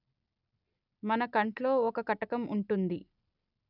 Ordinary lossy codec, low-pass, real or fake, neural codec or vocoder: none; 5.4 kHz; real; none